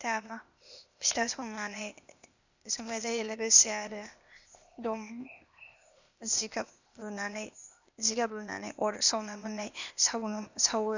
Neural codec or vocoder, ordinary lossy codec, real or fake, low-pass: codec, 16 kHz, 0.8 kbps, ZipCodec; none; fake; 7.2 kHz